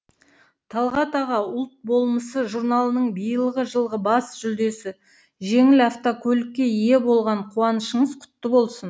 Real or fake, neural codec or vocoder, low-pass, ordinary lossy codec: real; none; none; none